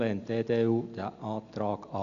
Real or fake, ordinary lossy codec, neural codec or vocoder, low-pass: real; none; none; 7.2 kHz